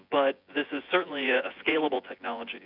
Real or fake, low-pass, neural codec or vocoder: fake; 5.4 kHz; vocoder, 24 kHz, 100 mel bands, Vocos